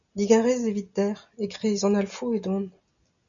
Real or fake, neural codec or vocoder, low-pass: real; none; 7.2 kHz